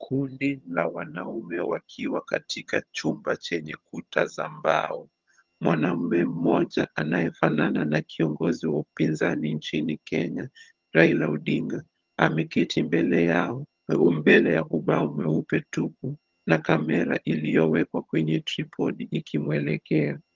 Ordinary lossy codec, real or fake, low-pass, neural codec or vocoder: Opus, 32 kbps; fake; 7.2 kHz; vocoder, 22.05 kHz, 80 mel bands, HiFi-GAN